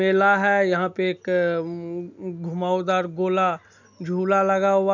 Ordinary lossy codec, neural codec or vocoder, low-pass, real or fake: none; none; 7.2 kHz; real